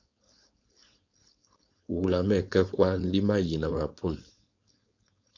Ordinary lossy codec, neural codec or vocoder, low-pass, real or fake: AAC, 48 kbps; codec, 16 kHz, 4.8 kbps, FACodec; 7.2 kHz; fake